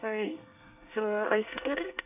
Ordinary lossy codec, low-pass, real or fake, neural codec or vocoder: none; 3.6 kHz; fake; codec, 24 kHz, 1 kbps, SNAC